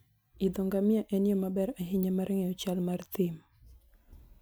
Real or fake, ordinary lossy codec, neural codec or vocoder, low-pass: real; none; none; none